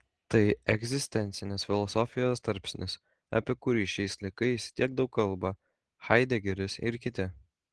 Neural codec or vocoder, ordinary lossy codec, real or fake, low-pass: none; Opus, 16 kbps; real; 9.9 kHz